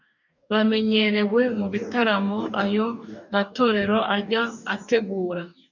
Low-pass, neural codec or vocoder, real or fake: 7.2 kHz; codec, 44.1 kHz, 2.6 kbps, DAC; fake